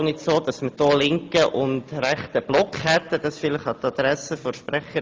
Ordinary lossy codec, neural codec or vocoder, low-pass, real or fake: Opus, 24 kbps; none; 7.2 kHz; real